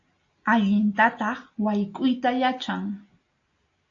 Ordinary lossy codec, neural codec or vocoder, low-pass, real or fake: AAC, 48 kbps; none; 7.2 kHz; real